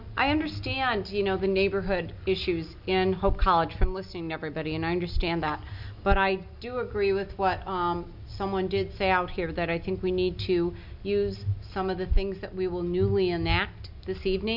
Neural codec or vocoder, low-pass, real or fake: none; 5.4 kHz; real